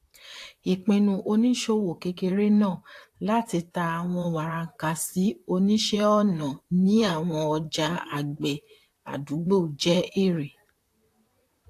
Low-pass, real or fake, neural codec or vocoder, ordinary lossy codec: 14.4 kHz; fake; vocoder, 44.1 kHz, 128 mel bands, Pupu-Vocoder; AAC, 64 kbps